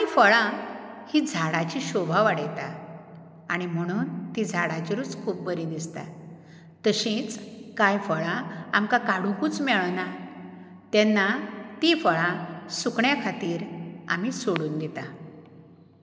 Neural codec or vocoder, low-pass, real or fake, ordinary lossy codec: none; none; real; none